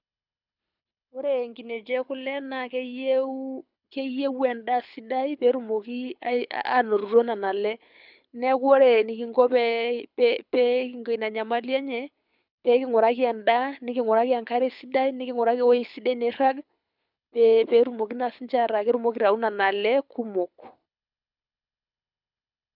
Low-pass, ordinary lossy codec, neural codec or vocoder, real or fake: 5.4 kHz; none; codec, 24 kHz, 6 kbps, HILCodec; fake